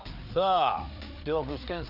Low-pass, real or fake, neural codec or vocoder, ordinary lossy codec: 5.4 kHz; fake; codec, 16 kHz, 4 kbps, FreqCodec, larger model; none